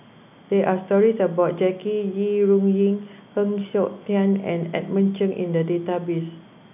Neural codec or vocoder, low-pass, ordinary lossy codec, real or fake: none; 3.6 kHz; none; real